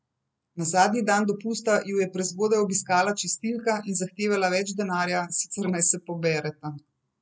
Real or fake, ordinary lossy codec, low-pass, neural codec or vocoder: real; none; none; none